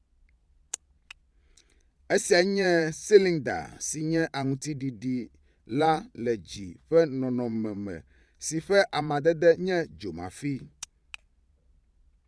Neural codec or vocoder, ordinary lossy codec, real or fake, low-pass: vocoder, 22.05 kHz, 80 mel bands, Vocos; none; fake; none